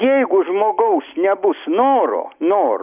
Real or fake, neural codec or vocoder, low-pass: real; none; 3.6 kHz